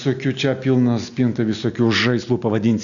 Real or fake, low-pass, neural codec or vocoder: real; 7.2 kHz; none